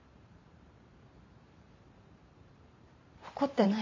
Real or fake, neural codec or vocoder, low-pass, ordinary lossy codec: fake; vocoder, 44.1 kHz, 128 mel bands every 512 samples, BigVGAN v2; 7.2 kHz; MP3, 64 kbps